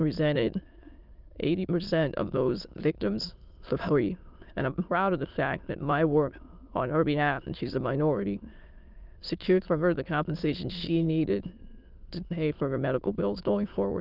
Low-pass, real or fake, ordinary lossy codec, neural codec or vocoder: 5.4 kHz; fake; Opus, 24 kbps; autoencoder, 22.05 kHz, a latent of 192 numbers a frame, VITS, trained on many speakers